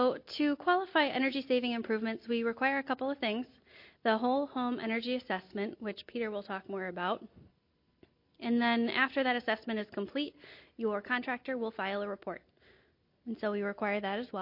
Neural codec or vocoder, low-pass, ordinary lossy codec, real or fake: none; 5.4 kHz; MP3, 32 kbps; real